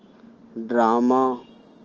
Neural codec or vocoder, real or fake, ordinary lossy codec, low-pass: none; real; Opus, 16 kbps; 7.2 kHz